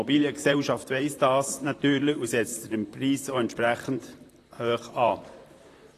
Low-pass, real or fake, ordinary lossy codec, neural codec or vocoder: 14.4 kHz; fake; AAC, 48 kbps; vocoder, 44.1 kHz, 128 mel bands, Pupu-Vocoder